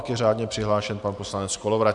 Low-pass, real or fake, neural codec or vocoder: 10.8 kHz; fake; vocoder, 44.1 kHz, 128 mel bands every 512 samples, BigVGAN v2